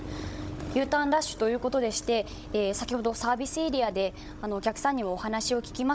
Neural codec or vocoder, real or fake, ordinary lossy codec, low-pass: codec, 16 kHz, 16 kbps, FunCodec, trained on Chinese and English, 50 frames a second; fake; none; none